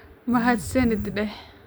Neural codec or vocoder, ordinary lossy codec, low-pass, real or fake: none; none; none; real